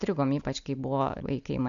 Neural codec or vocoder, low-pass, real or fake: none; 7.2 kHz; real